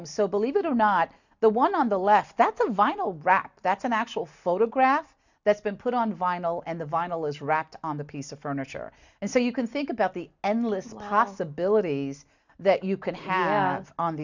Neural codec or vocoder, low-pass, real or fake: none; 7.2 kHz; real